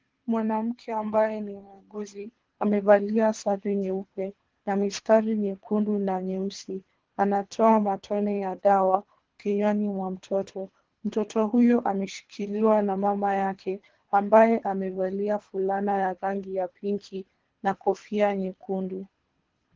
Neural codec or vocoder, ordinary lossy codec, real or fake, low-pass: codec, 24 kHz, 3 kbps, HILCodec; Opus, 16 kbps; fake; 7.2 kHz